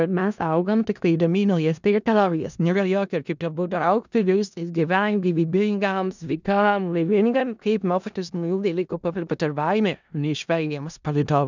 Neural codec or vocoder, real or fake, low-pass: codec, 16 kHz in and 24 kHz out, 0.4 kbps, LongCat-Audio-Codec, four codebook decoder; fake; 7.2 kHz